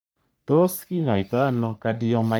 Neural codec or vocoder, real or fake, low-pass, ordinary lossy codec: codec, 44.1 kHz, 3.4 kbps, Pupu-Codec; fake; none; none